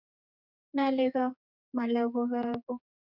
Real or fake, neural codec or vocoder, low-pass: fake; codec, 16 kHz, 4 kbps, X-Codec, HuBERT features, trained on general audio; 5.4 kHz